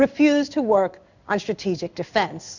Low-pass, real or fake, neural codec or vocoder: 7.2 kHz; fake; codec, 16 kHz in and 24 kHz out, 1 kbps, XY-Tokenizer